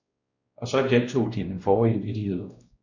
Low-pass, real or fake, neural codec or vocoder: 7.2 kHz; fake; codec, 16 kHz, 1 kbps, X-Codec, WavLM features, trained on Multilingual LibriSpeech